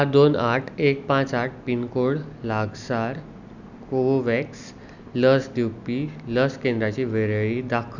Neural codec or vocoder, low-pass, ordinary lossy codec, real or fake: none; 7.2 kHz; none; real